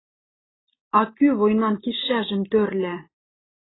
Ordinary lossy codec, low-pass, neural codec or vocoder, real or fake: AAC, 16 kbps; 7.2 kHz; none; real